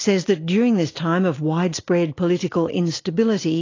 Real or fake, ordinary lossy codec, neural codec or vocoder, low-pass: real; AAC, 32 kbps; none; 7.2 kHz